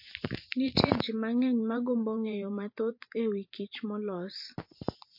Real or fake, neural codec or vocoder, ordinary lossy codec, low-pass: fake; vocoder, 44.1 kHz, 128 mel bands every 512 samples, BigVGAN v2; MP3, 32 kbps; 5.4 kHz